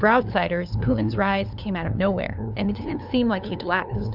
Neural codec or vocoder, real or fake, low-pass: codec, 16 kHz, 2 kbps, FunCodec, trained on LibriTTS, 25 frames a second; fake; 5.4 kHz